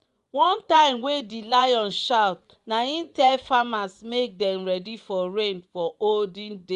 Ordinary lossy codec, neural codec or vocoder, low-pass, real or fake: Opus, 64 kbps; vocoder, 24 kHz, 100 mel bands, Vocos; 10.8 kHz; fake